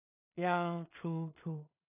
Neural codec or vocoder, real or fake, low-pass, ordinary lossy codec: codec, 16 kHz in and 24 kHz out, 0.4 kbps, LongCat-Audio-Codec, two codebook decoder; fake; 3.6 kHz; none